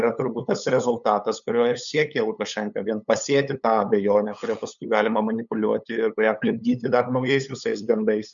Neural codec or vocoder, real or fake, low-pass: codec, 16 kHz, 8 kbps, FunCodec, trained on LibriTTS, 25 frames a second; fake; 7.2 kHz